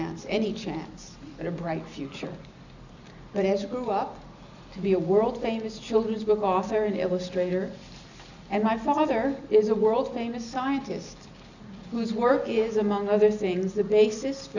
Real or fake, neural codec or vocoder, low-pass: real; none; 7.2 kHz